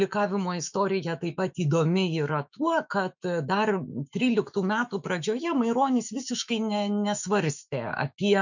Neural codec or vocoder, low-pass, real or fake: autoencoder, 48 kHz, 128 numbers a frame, DAC-VAE, trained on Japanese speech; 7.2 kHz; fake